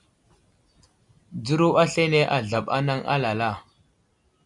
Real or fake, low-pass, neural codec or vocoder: real; 10.8 kHz; none